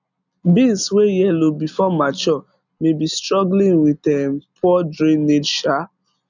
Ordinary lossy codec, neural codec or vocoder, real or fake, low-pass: none; none; real; 7.2 kHz